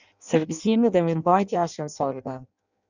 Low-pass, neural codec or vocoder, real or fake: 7.2 kHz; codec, 16 kHz in and 24 kHz out, 0.6 kbps, FireRedTTS-2 codec; fake